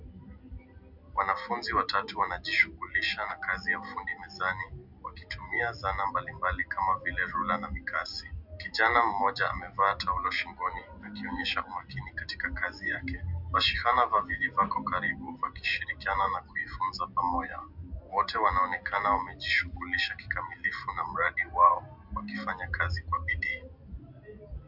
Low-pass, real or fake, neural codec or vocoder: 5.4 kHz; real; none